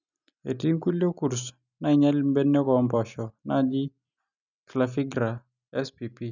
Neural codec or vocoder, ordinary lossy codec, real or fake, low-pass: none; none; real; 7.2 kHz